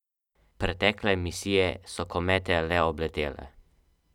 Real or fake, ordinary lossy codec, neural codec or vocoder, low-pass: real; none; none; 19.8 kHz